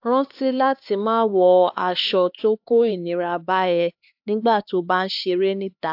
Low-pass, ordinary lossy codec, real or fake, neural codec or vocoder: 5.4 kHz; AAC, 48 kbps; fake; codec, 16 kHz, 2 kbps, X-Codec, HuBERT features, trained on LibriSpeech